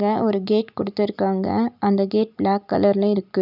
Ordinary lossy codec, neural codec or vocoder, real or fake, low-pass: none; none; real; 5.4 kHz